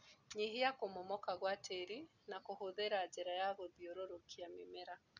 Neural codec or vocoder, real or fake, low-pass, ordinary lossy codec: none; real; 7.2 kHz; none